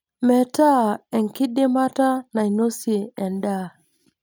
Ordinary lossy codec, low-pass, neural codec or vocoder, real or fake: none; none; none; real